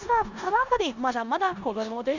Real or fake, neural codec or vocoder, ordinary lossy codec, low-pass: fake; codec, 16 kHz in and 24 kHz out, 0.9 kbps, LongCat-Audio-Codec, four codebook decoder; none; 7.2 kHz